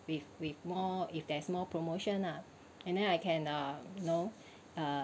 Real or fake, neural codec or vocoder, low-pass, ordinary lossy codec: real; none; none; none